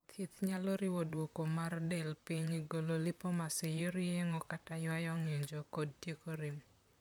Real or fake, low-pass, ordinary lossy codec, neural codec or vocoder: fake; none; none; vocoder, 44.1 kHz, 128 mel bands, Pupu-Vocoder